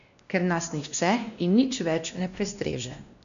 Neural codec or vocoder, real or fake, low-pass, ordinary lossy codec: codec, 16 kHz, 1 kbps, X-Codec, WavLM features, trained on Multilingual LibriSpeech; fake; 7.2 kHz; none